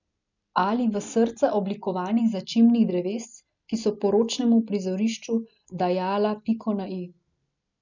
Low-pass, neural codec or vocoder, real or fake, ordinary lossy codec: 7.2 kHz; none; real; none